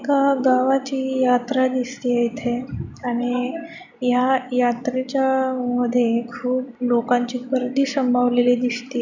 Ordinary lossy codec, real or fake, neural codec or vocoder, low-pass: none; real; none; 7.2 kHz